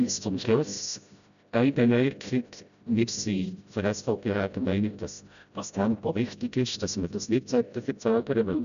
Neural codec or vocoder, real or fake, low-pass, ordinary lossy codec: codec, 16 kHz, 0.5 kbps, FreqCodec, smaller model; fake; 7.2 kHz; none